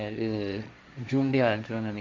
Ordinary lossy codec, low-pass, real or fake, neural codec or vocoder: none; none; fake; codec, 16 kHz, 1.1 kbps, Voila-Tokenizer